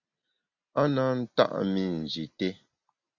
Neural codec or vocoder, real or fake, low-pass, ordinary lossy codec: none; real; 7.2 kHz; Opus, 64 kbps